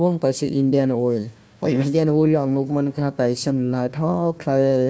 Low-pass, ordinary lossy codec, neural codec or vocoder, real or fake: none; none; codec, 16 kHz, 1 kbps, FunCodec, trained on Chinese and English, 50 frames a second; fake